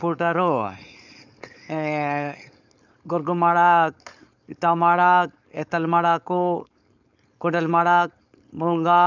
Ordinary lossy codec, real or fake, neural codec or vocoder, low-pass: none; fake; codec, 16 kHz, 4.8 kbps, FACodec; 7.2 kHz